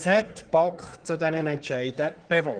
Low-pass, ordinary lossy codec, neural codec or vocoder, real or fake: 9.9 kHz; Opus, 24 kbps; codec, 24 kHz, 1 kbps, SNAC; fake